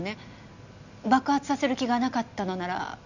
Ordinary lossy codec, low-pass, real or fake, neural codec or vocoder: none; 7.2 kHz; real; none